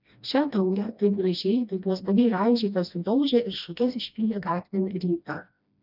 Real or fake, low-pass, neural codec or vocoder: fake; 5.4 kHz; codec, 16 kHz, 1 kbps, FreqCodec, smaller model